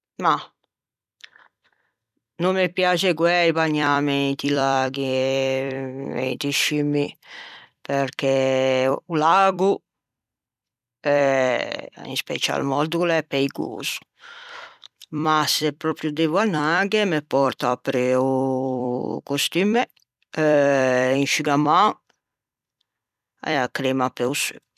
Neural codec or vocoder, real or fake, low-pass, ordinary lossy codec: vocoder, 44.1 kHz, 128 mel bands every 256 samples, BigVGAN v2; fake; 14.4 kHz; none